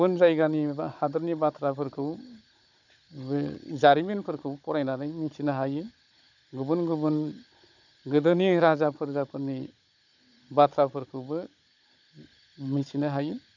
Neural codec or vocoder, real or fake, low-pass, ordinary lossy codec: codec, 16 kHz, 16 kbps, FunCodec, trained on Chinese and English, 50 frames a second; fake; 7.2 kHz; none